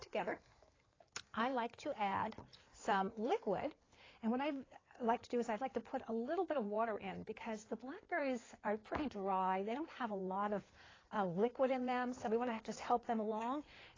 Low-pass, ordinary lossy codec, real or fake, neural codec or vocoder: 7.2 kHz; AAC, 32 kbps; fake; codec, 16 kHz in and 24 kHz out, 2.2 kbps, FireRedTTS-2 codec